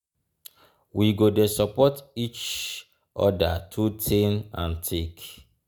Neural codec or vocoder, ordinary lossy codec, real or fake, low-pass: none; none; real; none